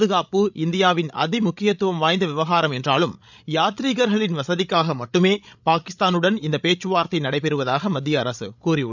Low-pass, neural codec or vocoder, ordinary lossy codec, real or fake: 7.2 kHz; codec, 16 kHz, 16 kbps, FreqCodec, larger model; none; fake